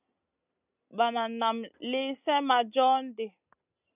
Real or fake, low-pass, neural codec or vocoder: real; 3.6 kHz; none